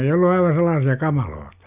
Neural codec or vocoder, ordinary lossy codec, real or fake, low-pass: none; none; real; 3.6 kHz